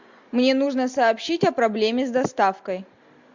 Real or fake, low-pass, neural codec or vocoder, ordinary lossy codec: real; 7.2 kHz; none; MP3, 64 kbps